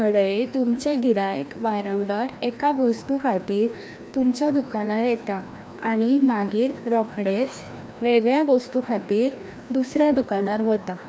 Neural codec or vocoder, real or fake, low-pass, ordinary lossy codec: codec, 16 kHz, 1 kbps, FreqCodec, larger model; fake; none; none